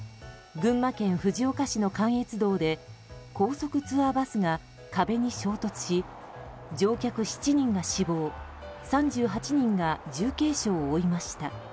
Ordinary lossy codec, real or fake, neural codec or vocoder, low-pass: none; real; none; none